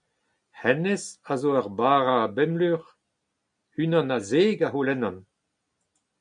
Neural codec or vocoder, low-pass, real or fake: none; 9.9 kHz; real